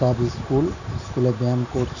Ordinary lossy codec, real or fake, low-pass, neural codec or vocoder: none; real; 7.2 kHz; none